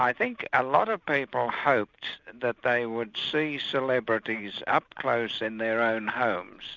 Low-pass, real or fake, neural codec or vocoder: 7.2 kHz; real; none